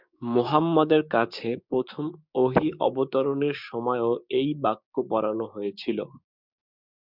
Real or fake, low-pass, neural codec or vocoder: fake; 5.4 kHz; codec, 16 kHz, 6 kbps, DAC